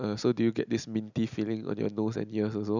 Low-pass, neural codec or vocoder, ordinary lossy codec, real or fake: 7.2 kHz; none; none; real